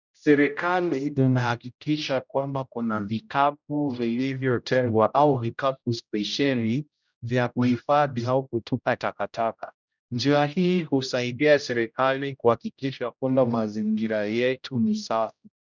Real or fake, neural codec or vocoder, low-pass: fake; codec, 16 kHz, 0.5 kbps, X-Codec, HuBERT features, trained on general audio; 7.2 kHz